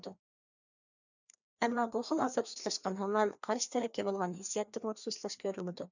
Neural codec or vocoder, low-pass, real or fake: codec, 16 kHz, 2 kbps, FreqCodec, larger model; 7.2 kHz; fake